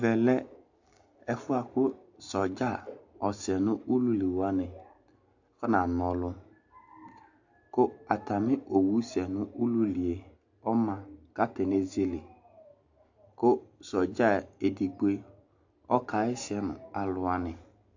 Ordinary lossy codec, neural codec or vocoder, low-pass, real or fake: AAC, 48 kbps; none; 7.2 kHz; real